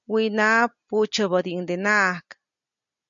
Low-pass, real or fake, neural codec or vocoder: 7.2 kHz; real; none